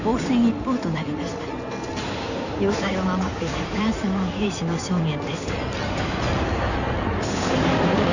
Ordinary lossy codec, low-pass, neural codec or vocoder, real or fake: none; 7.2 kHz; codec, 16 kHz in and 24 kHz out, 2.2 kbps, FireRedTTS-2 codec; fake